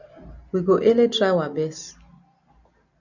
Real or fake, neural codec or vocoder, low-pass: real; none; 7.2 kHz